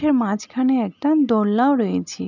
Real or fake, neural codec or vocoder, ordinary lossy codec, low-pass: real; none; none; 7.2 kHz